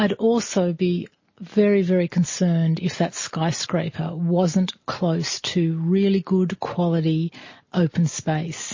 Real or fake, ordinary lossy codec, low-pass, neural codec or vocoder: real; MP3, 32 kbps; 7.2 kHz; none